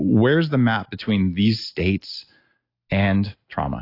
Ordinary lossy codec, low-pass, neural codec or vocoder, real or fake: AAC, 32 kbps; 5.4 kHz; none; real